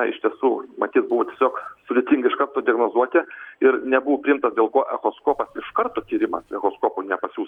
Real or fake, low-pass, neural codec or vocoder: real; 19.8 kHz; none